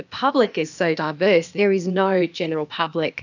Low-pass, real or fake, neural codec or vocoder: 7.2 kHz; fake; codec, 16 kHz, 0.8 kbps, ZipCodec